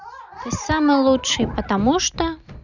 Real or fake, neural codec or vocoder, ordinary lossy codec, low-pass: real; none; none; 7.2 kHz